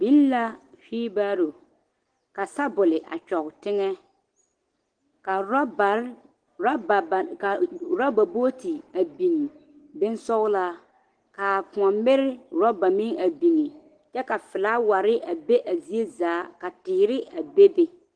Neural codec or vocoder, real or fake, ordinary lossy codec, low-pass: none; real; Opus, 16 kbps; 9.9 kHz